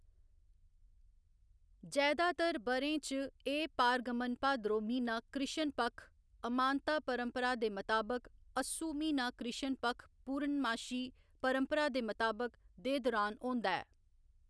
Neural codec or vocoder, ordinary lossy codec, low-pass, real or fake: none; none; none; real